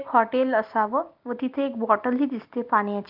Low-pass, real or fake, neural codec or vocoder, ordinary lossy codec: 5.4 kHz; real; none; Opus, 24 kbps